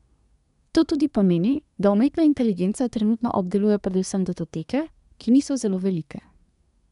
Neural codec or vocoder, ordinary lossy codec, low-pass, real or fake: codec, 24 kHz, 1 kbps, SNAC; none; 10.8 kHz; fake